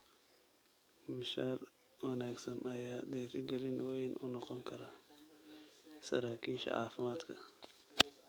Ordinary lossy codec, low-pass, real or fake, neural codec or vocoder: none; none; fake; codec, 44.1 kHz, 7.8 kbps, DAC